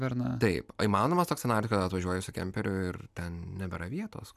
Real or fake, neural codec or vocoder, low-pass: real; none; 14.4 kHz